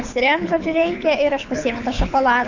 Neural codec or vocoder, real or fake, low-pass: codec, 24 kHz, 6 kbps, HILCodec; fake; 7.2 kHz